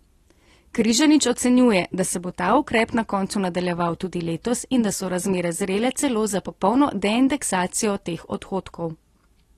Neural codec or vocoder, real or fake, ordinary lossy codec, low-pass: none; real; AAC, 32 kbps; 14.4 kHz